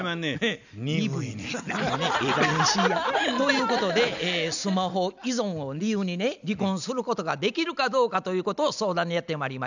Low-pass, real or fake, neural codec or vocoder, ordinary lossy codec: 7.2 kHz; real; none; none